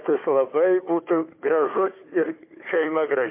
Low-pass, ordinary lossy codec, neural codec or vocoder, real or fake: 3.6 kHz; MP3, 24 kbps; codec, 16 kHz, 4 kbps, FunCodec, trained on Chinese and English, 50 frames a second; fake